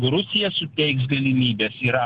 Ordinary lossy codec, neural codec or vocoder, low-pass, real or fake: Opus, 16 kbps; none; 10.8 kHz; real